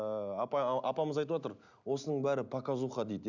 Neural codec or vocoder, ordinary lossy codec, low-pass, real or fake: none; none; 7.2 kHz; real